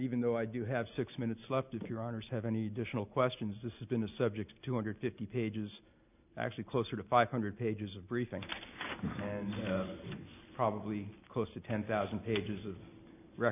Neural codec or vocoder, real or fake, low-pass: none; real; 3.6 kHz